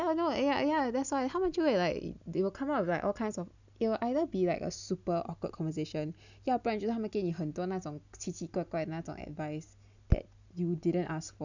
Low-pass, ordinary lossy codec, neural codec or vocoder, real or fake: 7.2 kHz; none; none; real